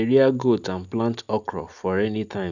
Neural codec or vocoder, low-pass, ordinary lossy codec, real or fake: none; 7.2 kHz; none; real